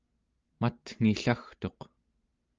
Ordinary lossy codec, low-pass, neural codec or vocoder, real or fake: Opus, 32 kbps; 7.2 kHz; none; real